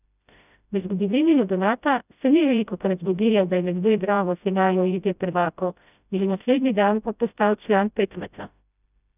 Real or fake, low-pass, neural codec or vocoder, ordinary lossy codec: fake; 3.6 kHz; codec, 16 kHz, 0.5 kbps, FreqCodec, smaller model; none